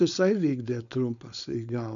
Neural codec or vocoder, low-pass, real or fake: codec, 16 kHz, 4.8 kbps, FACodec; 7.2 kHz; fake